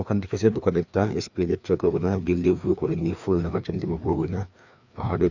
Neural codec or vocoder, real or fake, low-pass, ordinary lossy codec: codec, 16 kHz, 2 kbps, FreqCodec, larger model; fake; 7.2 kHz; none